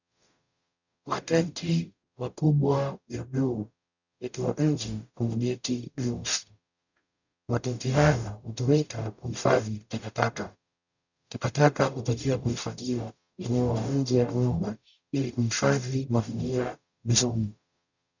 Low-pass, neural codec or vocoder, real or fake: 7.2 kHz; codec, 44.1 kHz, 0.9 kbps, DAC; fake